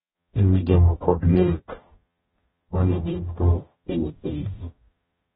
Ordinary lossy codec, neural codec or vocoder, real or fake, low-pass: AAC, 16 kbps; codec, 44.1 kHz, 0.9 kbps, DAC; fake; 19.8 kHz